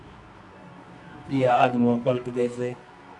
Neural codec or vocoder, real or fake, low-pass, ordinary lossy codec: codec, 24 kHz, 0.9 kbps, WavTokenizer, medium music audio release; fake; 10.8 kHz; MP3, 96 kbps